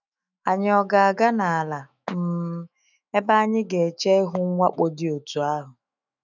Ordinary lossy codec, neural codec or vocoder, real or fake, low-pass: none; autoencoder, 48 kHz, 128 numbers a frame, DAC-VAE, trained on Japanese speech; fake; 7.2 kHz